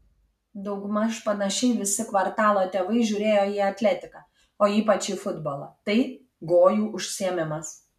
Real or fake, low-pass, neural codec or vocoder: real; 14.4 kHz; none